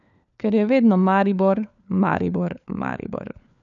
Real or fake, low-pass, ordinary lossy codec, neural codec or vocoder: fake; 7.2 kHz; none; codec, 16 kHz, 4 kbps, FunCodec, trained on LibriTTS, 50 frames a second